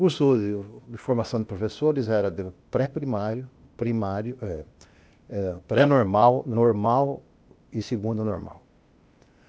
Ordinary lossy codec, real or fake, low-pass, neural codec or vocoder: none; fake; none; codec, 16 kHz, 0.8 kbps, ZipCodec